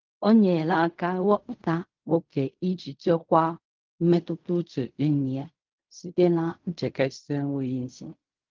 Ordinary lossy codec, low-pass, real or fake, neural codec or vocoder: Opus, 32 kbps; 7.2 kHz; fake; codec, 16 kHz in and 24 kHz out, 0.4 kbps, LongCat-Audio-Codec, fine tuned four codebook decoder